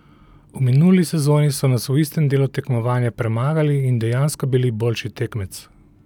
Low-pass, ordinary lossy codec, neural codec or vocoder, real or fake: 19.8 kHz; none; none; real